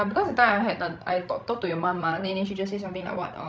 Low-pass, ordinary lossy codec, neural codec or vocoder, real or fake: none; none; codec, 16 kHz, 16 kbps, FreqCodec, larger model; fake